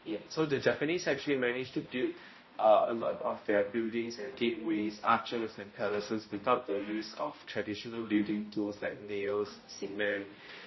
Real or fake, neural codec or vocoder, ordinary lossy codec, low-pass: fake; codec, 16 kHz, 0.5 kbps, X-Codec, HuBERT features, trained on balanced general audio; MP3, 24 kbps; 7.2 kHz